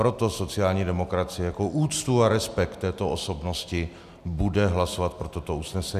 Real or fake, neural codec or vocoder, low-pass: real; none; 14.4 kHz